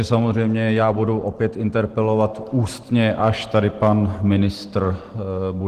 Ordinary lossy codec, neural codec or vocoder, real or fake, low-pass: Opus, 16 kbps; none; real; 14.4 kHz